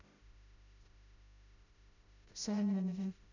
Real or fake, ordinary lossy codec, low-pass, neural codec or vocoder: fake; none; 7.2 kHz; codec, 16 kHz, 0.5 kbps, FreqCodec, smaller model